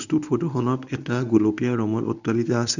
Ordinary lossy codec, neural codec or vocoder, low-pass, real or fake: none; codec, 24 kHz, 0.9 kbps, WavTokenizer, medium speech release version 1; 7.2 kHz; fake